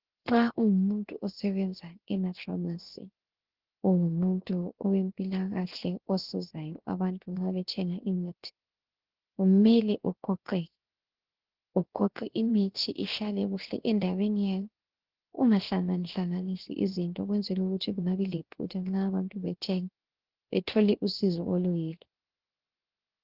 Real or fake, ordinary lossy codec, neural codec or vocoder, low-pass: fake; Opus, 16 kbps; codec, 16 kHz, 0.7 kbps, FocalCodec; 5.4 kHz